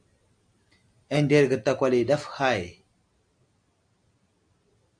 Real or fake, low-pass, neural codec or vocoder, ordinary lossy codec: real; 9.9 kHz; none; MP3, 64 kbps